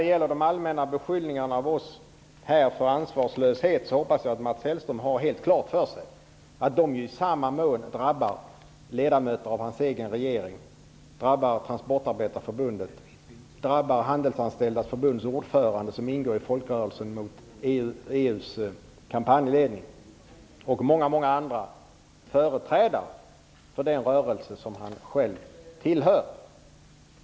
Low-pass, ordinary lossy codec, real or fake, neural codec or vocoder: none; none; real; none